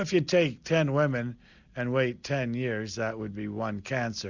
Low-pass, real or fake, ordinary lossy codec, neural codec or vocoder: 7.2 kHz; real; Opus, 64 kbps; none